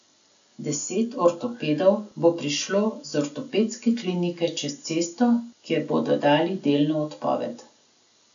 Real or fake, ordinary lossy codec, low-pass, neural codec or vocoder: real; none; 7.2 kHz; none